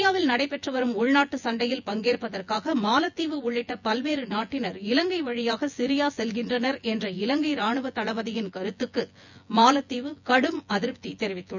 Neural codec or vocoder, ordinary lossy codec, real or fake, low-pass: vocoder, 24 kHz, 100 mel bands, Vocos; none; fake; 7.2 kHz